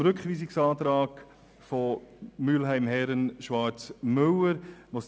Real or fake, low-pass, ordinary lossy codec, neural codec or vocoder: real; none; none; none